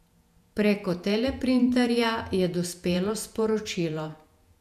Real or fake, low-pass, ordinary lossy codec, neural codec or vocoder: fake; 14.4 kHz; none; vocoder, 48 kHz, 128 mel bands, Vocos